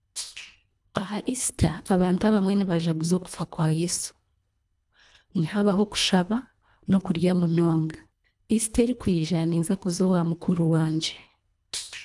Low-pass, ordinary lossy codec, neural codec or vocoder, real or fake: none; none; codec, 24 kHz, 1.5 kbps, HILCodec; fake